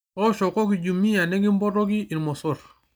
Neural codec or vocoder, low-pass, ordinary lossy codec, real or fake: none; none; none; real